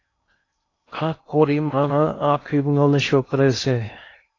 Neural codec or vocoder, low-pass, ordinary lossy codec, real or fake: codec, 16 kHz in and 24 kHz out, 0.6 kbps, FocalCodec, streaming, 2048 codes; 7.2 kHz; AAC, 32 kbps; fake